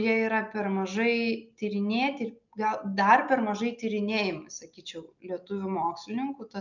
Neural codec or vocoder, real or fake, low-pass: none; real; 7.2 kHz